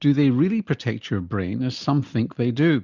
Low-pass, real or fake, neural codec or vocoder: 7.2 kHz; real; none